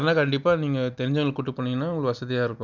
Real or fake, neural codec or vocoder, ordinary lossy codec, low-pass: real; none; none; 7.2 kHz